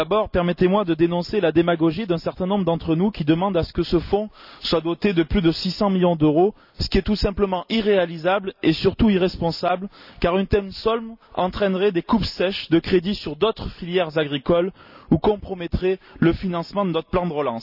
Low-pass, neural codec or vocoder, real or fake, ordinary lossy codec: 5.4 kHz; none; real; none